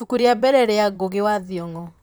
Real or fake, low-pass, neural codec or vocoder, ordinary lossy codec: fake; none; vocoder, 44.1 kHz, 128 mel bands, Pupu-Vocoder; none